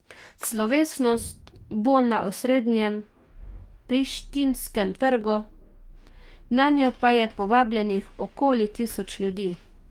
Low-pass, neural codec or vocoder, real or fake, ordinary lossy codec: 19.8 kHz; codec, 44.1 kHz, 2.6 kbps, DAC; fake; Opus, 32 kbps